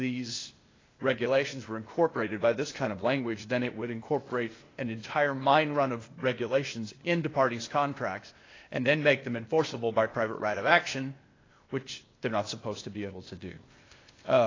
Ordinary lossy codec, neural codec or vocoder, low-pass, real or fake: AAC, 32 kbps; codec, 16 kHz, 0.8 kbps, ZipCodec; 7.2 kHz; fake